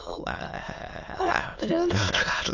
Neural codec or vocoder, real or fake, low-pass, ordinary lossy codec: autoencoder, 22.05 kHz, a latent of 192 numbers a frame, VITS, trained on many speakers; fake; 7.2 kHz; AAC, 48 kbps